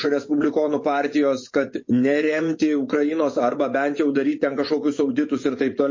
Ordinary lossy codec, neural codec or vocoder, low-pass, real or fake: MP3, 32 kbps; vocoder, 24 kHz, 100 mel bands, Vocos; 7.2 kHz; fake